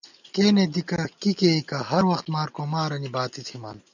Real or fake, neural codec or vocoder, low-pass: real; none; 7.2 kHz